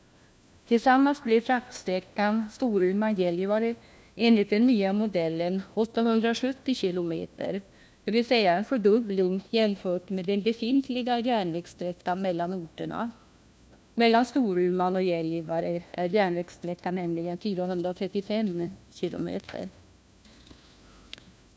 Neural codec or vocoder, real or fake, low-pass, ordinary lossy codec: codec, 16 kHz, 1 kbps, FunCodec, trained on LibriTTS, 50 frames a second; fake; none; none